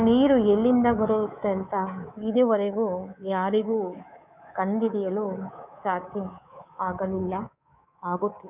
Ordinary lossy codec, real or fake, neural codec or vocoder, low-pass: none; fake; codec, 24 kHz, 3.1 kbps, DualCodec; 3.6 kHz